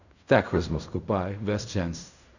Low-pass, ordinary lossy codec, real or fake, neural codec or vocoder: 7.2 kHz; none; fake; codec, 16 kHz in and 24 kHz out, 0.4 kbps, LongCat-Audio-Codec, fine tuned four codebook decoder